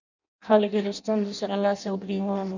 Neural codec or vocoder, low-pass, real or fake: codec, 16 kHz in and 24 kHz out, 0.6 kbps, FireRedTTS-2 codec; 7.2 kHz; fake